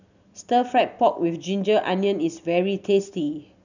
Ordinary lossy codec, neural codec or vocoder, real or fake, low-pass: none; none; real; 7.2 kHz